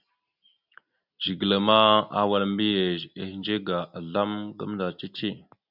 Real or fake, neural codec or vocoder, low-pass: real; none; 5.4 kHz